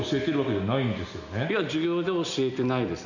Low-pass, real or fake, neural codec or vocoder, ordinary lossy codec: 7.2 kHz; real; none; none